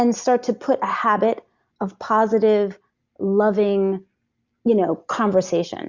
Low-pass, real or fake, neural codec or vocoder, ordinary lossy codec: 7.2 kHz; real; none; Opus, 64 kbps